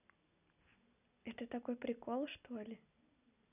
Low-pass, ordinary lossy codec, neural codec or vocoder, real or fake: 3.6 kHz; none; none; real